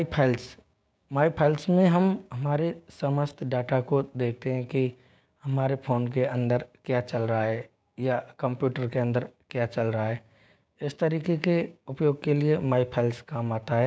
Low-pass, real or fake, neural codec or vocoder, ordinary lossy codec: none; fake; codec, 16 kHz, 6 kbps, DAC; none